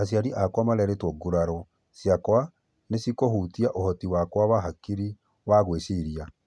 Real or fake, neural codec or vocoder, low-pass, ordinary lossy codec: real; none; none; none